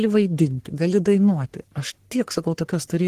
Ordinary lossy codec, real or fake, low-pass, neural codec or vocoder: Opus, 16 kbps; fake; 14.4 kHz; codec, 44.1 kHz, 3.4 kbps, Pupu-Codec